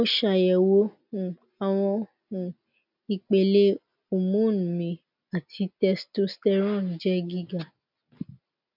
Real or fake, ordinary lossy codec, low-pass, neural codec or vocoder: real; none; 5.4 kHz; none